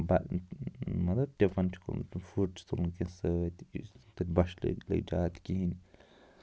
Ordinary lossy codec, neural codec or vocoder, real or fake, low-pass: none; none; real; none